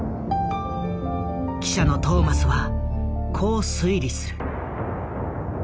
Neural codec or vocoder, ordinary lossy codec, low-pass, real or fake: none; none; none; real